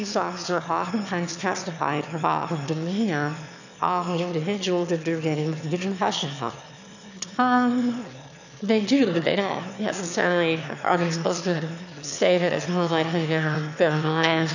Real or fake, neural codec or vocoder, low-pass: fake; autoencoder, 22.05 kHz, a latent of 192 numbers a frame, VITS, trained on one speaker; 7.2 kHz